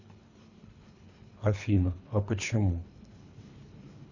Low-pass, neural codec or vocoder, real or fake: 7.2 kHz; codec, 24 kHz, 3 kbps, HILCodec; fake